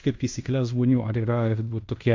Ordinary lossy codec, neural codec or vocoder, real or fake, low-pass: MP3, 48 kbps; codec, 16 kHz in and 24 kHz out, 0.9 kbps, LongCat-Audio-Codec, fine tuned four codebook decoder; fake; 7.2 kHz